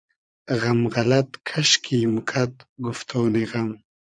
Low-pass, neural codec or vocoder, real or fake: 9.9 kHz; vocoder, 22.05 kHz, 80 mel bands, Vocos; fake